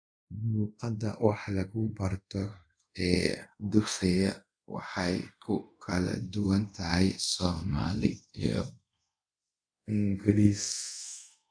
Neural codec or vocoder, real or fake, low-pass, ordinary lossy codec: codec, 24 kHz, 0.5 kbps, DualCodec; fake; 9.9 kHz; none